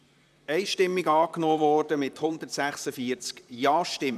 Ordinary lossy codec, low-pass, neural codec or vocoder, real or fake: none; 14.4 kHz; vocoder, 44.1 kHz, 128 mel bands, Pupu-Vocoder; fake